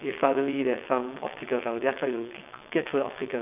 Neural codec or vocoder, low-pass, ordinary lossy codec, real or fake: vocoder, 22.05 kHz, 80 mel bands, WaveNeXt; 3.6 kHz; none; fake